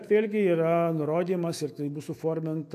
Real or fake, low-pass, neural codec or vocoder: fake; 14.4 kHz; autoencoder, 48 kHz, 128 numbers a frame, DAC-VAE, trained on Japanese speech